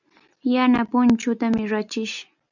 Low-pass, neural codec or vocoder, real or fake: 7.2 kHz; none; real